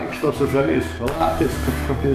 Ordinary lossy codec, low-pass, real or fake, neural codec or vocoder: AAC, 96 kbps; 14.4 kHz; fake; codec, 44.1 kHz, 7.8 kbps, DAC